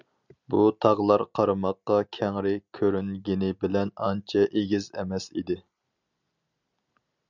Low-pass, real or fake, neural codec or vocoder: 7.2 kHz; real; none